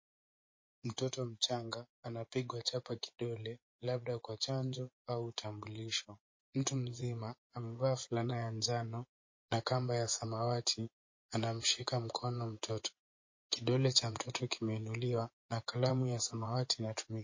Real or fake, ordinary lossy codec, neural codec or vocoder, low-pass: fake; MP3, 32 kbps; vocoder, 24 kHz, 100 mel bands, Vocos; 7.2 kHz